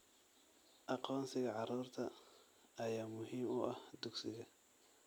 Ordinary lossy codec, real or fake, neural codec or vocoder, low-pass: none; real; none; none